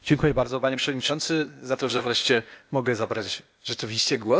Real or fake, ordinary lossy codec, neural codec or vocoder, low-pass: fake; none; codec, 16 kHz, 0.8 kbps, ZipCodec; none